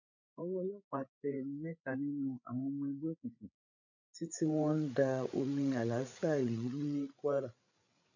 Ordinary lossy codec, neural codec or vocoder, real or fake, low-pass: none; codec, 16 kHz, 8 kbps, FreqCodec, larger model; fake; 7.2 kHz